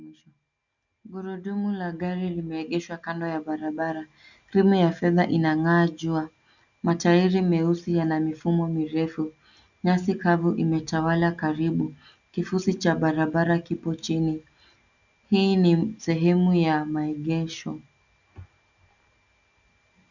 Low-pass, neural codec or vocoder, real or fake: 7.2 kHz; none; real